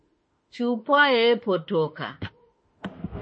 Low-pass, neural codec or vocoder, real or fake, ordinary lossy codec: 9.9 kHz; autoencoder, 48 kHz, 32 numbers a frame, DAC-VAE, trained on Japanese speech; fake; MP3, 32 kbps